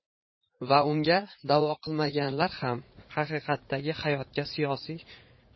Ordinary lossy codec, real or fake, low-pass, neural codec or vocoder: MP3, 24 kbps; fake; 7.2 kHz; vocoder, 44.1 kHz, 80 mel bands, Vocos